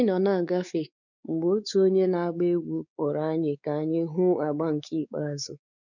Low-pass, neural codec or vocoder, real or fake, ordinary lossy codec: 7.2 kHz; codec, 16 kHz, 4 kbps, X-Codec, WavLM features, trained on Multilingual LibriSpeech; fake; none